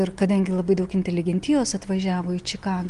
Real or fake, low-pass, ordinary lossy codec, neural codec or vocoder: real; 10.8 kHz; Opus, 24 kbps; none